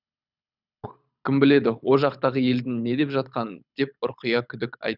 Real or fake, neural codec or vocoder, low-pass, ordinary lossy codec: fake; codec, 24 kHz, 6 kbps, HILCodec; 5.4 kHz; none